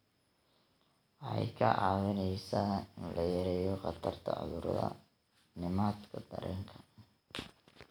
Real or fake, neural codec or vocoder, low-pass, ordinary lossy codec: real; none; none; none